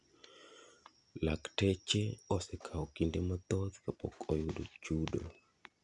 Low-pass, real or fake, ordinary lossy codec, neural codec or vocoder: 10.8 kHz; real; none; none